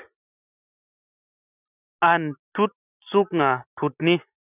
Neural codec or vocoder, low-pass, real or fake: none; 3.6 kHz; real